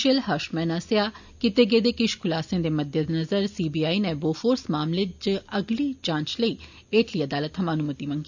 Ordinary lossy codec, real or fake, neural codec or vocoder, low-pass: none; real; none; 7.2 kHz